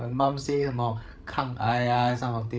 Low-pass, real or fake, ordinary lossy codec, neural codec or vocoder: none; fake; none; codec, 16 kHz, 16 kbps, FreqCodec, larger model